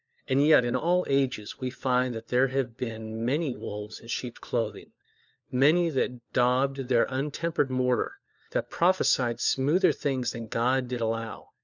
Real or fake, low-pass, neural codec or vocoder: fake; 7.2 kHz; codec, 16 kHz, 4 kbps, FunCodec, trained on LibriTTS, 50 frames a second